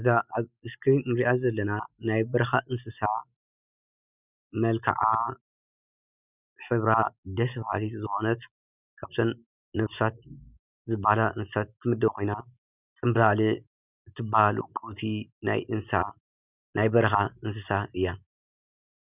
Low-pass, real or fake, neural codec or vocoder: 3.6 kHz; real; none